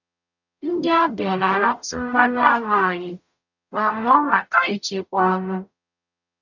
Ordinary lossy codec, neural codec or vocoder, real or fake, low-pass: none; codec, 44.1 kHz, 0.9 kbps, DAC; fake; 7.2 kHz